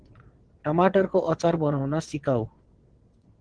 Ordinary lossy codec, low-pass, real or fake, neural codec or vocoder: Opus, 16 kbps; 9.9 kHz; fake; vocoder, 22.05 kHz, 80 mel bands, WaveNeXt